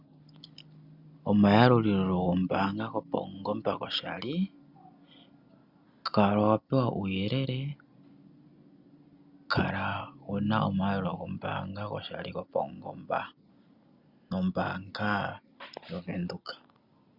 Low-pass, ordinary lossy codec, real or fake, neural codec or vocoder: 5.4 kHz; Opus, 64 kbps; real; none